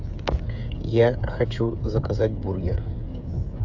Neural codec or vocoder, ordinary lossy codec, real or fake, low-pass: codec, 16 kHz, 16 kbps, FreqCodec, smaller model; AAC, 48 kbps; fake; 7.2 kHz